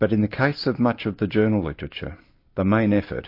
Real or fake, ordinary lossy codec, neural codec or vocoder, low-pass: real; MP3, 32 kbps; none; 5.4 kHz